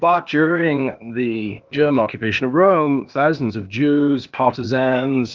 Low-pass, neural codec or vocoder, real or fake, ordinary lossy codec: 7.2 kHz; codec, 16 kHz, 0.8 kbps, ZipCodec; fake; Opus, 32 kbps